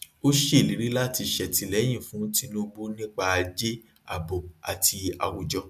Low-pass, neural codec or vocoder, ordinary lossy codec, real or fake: 14.4 kHz; none; none; real